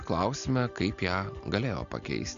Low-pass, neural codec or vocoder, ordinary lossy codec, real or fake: 7.2 kHz; none; MP3, 96 kbps; real